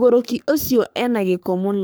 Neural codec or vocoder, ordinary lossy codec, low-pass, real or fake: codec, 44.1 kHz, 3.4 kbps, Pupu-Codec; none; none; fake